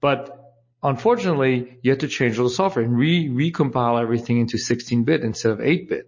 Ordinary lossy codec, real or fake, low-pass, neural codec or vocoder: MP3, 32 kbps; real; 7.2 kHz; none